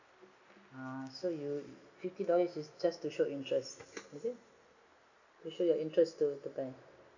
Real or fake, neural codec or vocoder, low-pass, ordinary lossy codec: real; none; 7.2 kHz; none